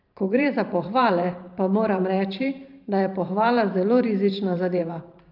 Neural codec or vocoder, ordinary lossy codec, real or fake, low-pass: vocoder, 44.1 kHz, 128 mel bands every 512 samples, BigVGAN v2; Opus, 24 kbps; fake; 5.4 kHz